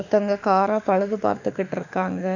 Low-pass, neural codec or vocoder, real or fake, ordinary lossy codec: 7.2 kHz; autoencoder, 48 kHz, 32 numbers a frame, DAC-VAE, trained on Japanese speech; fake; none